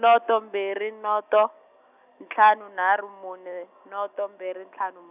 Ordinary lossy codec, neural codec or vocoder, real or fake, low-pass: none; none; real; 3.6 kHz